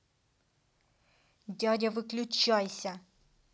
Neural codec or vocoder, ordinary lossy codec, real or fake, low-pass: none; none; real; none